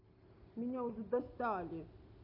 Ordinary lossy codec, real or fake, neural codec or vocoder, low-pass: none; real; none; 5.4 kHz